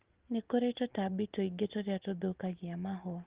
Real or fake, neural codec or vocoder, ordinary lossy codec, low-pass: real; none; Opus, 16 kbps; 3.6 kHz